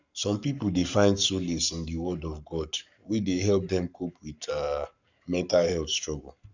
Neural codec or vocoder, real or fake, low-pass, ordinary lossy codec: codec, 44.1 kHz, 7.8 kbps, Pupu-Codec; fake; 7.2 kHz; none